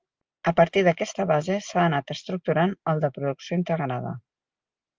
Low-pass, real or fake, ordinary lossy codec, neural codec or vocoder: 7.2 kHz; real; Opus, 24 kbps; none